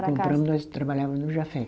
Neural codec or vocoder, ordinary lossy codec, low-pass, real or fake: none; none; none; real